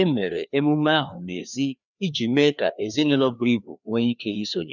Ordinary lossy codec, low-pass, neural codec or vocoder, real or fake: none; 7.2 kHz; codec, 16 kHz, 2 kbps, FreqCodec, larger model; fake